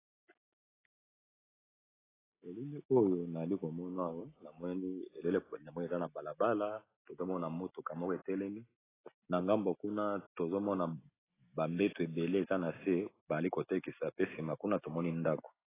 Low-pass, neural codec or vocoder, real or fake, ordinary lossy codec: 3.6 kHz; none; real; AAC, 16 kbps